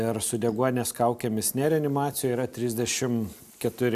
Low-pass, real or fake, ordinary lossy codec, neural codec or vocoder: 14.4 kHz; real; AAC, 96 kbps; none